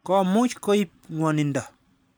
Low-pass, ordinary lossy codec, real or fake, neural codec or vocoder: none; none; fake; vocoder, 44.1 kHz, 128 mel bands every 512 samples, BigVGAN v2